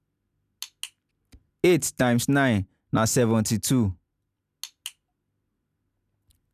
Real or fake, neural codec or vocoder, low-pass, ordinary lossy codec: real; none; 14.4 kHz; none